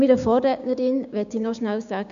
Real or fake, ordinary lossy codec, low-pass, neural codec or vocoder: fake; none; 7.2 kHz; codec, 16 kHz, 6 kbps, DAC